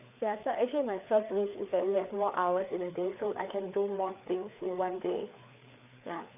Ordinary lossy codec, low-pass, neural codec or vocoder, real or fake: none; 3.6 kHz; codec, 16 kHz, 4 kbps, FreqCodec, larger model; fake